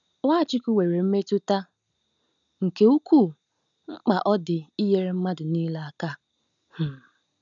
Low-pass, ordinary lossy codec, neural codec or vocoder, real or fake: 7.2 kHz; none; none; real